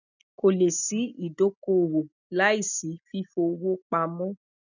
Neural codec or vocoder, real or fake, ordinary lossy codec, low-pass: none; real; none; 7.2 kHz